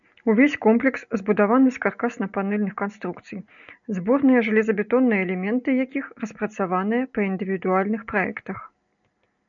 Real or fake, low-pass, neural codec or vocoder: real; 7.2 kHz; none